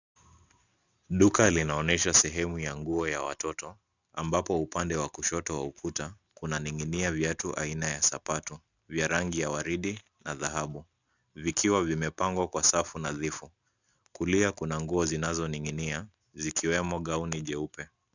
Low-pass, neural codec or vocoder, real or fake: 7.2 kHz; none; real